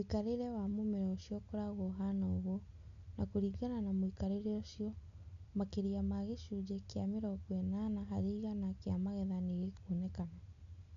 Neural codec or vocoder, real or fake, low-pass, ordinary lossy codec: none; real; 7.2 kHz; none